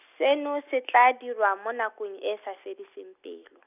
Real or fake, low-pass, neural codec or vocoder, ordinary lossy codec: real; 3.6 kHz; none; none